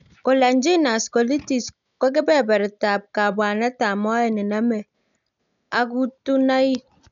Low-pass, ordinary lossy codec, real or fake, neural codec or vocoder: 7.2 kHz; none; real; none